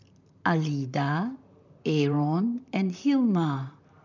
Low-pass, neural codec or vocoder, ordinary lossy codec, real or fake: 7.2 kHz; vocoder, 44.1 kHz, 128 mel bands, Pupu-Vocoder; none; fake